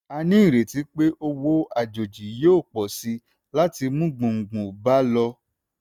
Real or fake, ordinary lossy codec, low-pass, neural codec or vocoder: real; none; 19.8 kHz; none